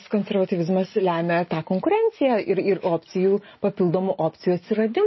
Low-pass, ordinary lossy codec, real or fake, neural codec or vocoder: 7.2 kHz; MP3, 24 kbps; real; none